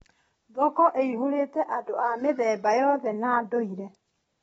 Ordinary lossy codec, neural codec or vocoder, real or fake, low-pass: AAC, 24 kbps; none; real; 19.8 kHz